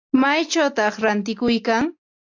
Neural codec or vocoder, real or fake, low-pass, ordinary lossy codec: none; real; 7.2 kHz; AAC, 48 kbps